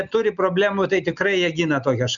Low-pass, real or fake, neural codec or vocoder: 7.2 kHz; real; none